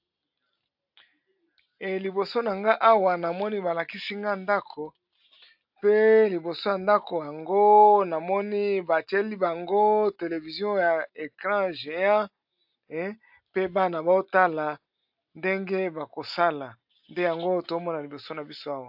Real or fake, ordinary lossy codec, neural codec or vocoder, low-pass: real; AAC, 48 kbps; none; 5.4 kHz